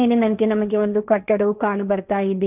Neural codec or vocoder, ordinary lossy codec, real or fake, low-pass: codec, 16 kHz, 1.1 kbps, Voila-Tokenizer; none; fake; 3.6 kHz